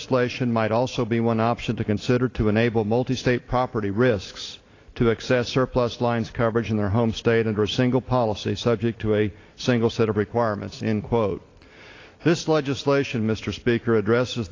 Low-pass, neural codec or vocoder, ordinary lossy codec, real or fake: 7.2 kHz; none; AAC, 32 kbps; real